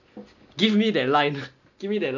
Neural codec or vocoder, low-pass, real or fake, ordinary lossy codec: none; 7.2 kHz; real; none